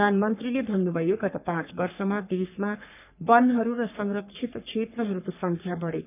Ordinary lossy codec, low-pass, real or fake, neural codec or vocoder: none; 3.6 kHz; fake; codec, 44.1 kHz, 3.4 kbps, Pupu-Codec